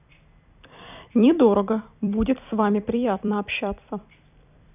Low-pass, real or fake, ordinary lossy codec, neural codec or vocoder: 3.6 kHz; fake; none; vocoder, 44.1 kHz, 128 mel bands every 256 samples, BigVGAN v2